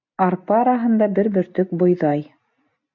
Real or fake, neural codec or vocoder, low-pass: real; none; 7.2 kHz